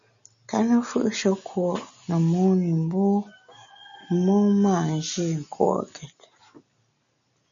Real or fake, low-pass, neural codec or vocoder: real; 7.2 kHz; none